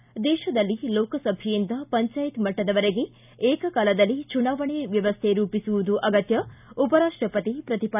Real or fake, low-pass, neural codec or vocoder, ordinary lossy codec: real; 3.6 kHz; none; none